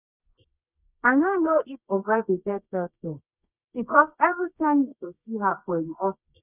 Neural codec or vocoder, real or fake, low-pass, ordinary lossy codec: codec, 24 kHz, 0.9 kbps, WavTokenizer, medium music audio release; fake; 3.6 kHz; none